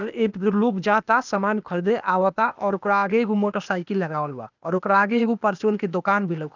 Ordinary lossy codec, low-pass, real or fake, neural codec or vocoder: none; 7.2 kHz; fake; codec, 16 kHz, 0.8 kbps, ZipCodec